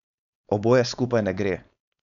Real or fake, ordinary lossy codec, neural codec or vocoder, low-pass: fake; none; codec, 16 kHz, 4.8 kbps, FACodec; 7.2 kHz